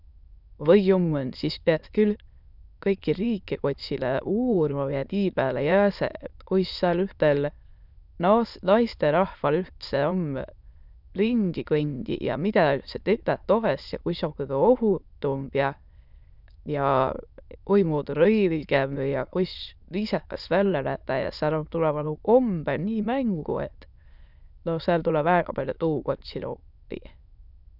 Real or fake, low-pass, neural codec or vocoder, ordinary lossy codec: fake; 5.4 kHz; autoencoder, 22.05 kHz, a latent of 192 numbers a frame, VITS, trained on many speakers; none